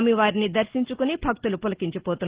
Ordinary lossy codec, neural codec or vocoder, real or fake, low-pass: Opus, 16 kbps; none; real; 3.6 kHz